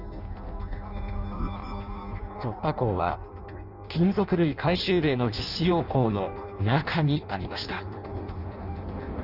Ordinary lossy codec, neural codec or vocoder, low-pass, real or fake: none; codec, 16 kHz in and 24 kHz out, 0.6 kbps, FireRedTTS-2 codec; 5.4 kHz; fake